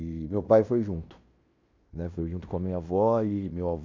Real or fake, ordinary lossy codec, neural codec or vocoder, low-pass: fake; AAC, 48 kbps; codec, 16 kHz in and 24 kHz out, 0.9 kbps, LongCat-Audio-Codec, fine tuned four codebook decoder; 7.2 kHz